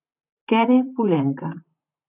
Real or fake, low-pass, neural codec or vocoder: fake; 3.6 kHz; vocoder, 44.1 kHz, 128 mel bands, Pupu-Vocoder